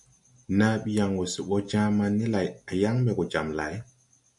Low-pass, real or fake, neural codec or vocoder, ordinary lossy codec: 10.8 kHz; real; none; MP3, 64 kbps